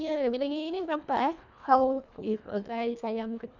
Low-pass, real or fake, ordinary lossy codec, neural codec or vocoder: 7.2 kHz; fake; none; codec, 24 kHz, 1.5 kbps, HILCodec